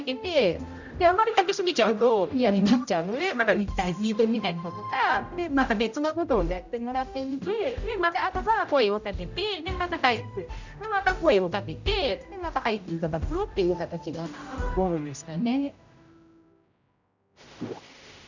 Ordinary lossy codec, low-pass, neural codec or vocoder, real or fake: none; 7.2 kHz; codec, 16 kHz, 0.5 kbps, X-Codec, HuBERT features, trained on general audio; fake